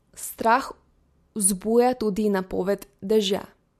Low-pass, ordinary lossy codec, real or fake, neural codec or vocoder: 14.4 kHz; MP3, 64 kbps; real; none